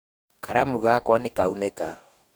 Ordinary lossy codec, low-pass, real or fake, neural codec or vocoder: none; none; fake; codec, 44.1 kHz, 2.6 kbps, DAC